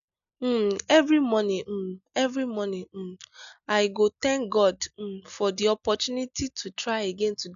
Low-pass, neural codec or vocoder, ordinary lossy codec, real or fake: 7.2 kHz; none; none; real